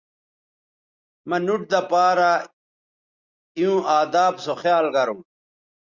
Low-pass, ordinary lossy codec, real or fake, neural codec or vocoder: 7.2 kHz; Opus, 64 kbps; real; none